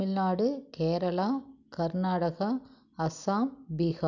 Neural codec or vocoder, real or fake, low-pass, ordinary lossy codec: none; real; 7.2 kHz; none